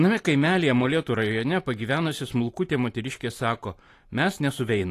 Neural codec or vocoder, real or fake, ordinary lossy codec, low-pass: vocoder, 44.1 kHz, 128 mel bands every 512 samples, BigVGAN v2; fake; AAC, 48 kbps; 14.4 kHz